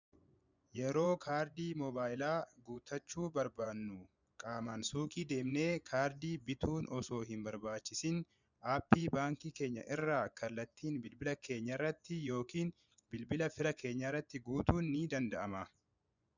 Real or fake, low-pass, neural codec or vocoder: fake; 7.2 kHz; vocoder, 24 kHz, 100 mel bands, Vocos